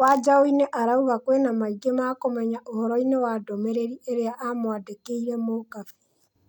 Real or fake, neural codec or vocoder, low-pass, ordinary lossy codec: real; none; 19.8 kHz; none